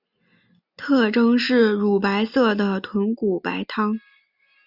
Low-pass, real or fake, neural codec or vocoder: 5.4 kHz; real; none